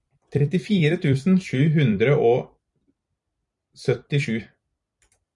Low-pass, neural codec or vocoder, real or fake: 10.8 kHz; vocoder, 44.1 kHz, 128 mel bands every 256 samples, BigVGAN v2; fake